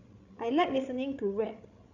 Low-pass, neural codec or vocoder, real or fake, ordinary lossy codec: 7.2 kHz; codec, 16 kHz, 16 kbps, FreqCodec, larger model; fake; none